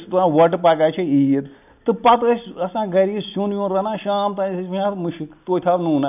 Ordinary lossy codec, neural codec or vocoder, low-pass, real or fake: none; none; 3.6 kHz; real